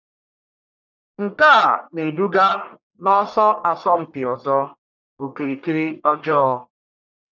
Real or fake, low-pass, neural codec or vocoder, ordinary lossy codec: fake; 7.2 kHz; codec, 44.1 kHz, 1.7 kbps, Pupu-Codec; none